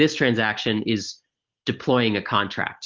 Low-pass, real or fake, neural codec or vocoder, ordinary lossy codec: 7.2 kHz; real; none; Opus, 16 kbps